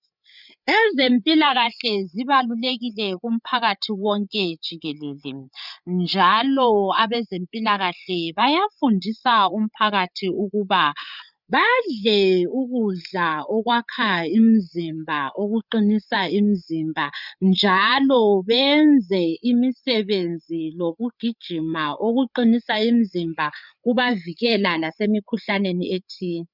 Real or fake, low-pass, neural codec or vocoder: fake; 5.4 kHz; codec, 16 kHz, 8 kbps, FreqCodec, larger model